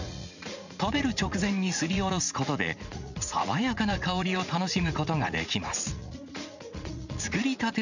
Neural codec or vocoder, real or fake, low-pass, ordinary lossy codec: vocoder, 44.1 kHz, 80 mel bands, Vocos; fake; 7.2 kHz; none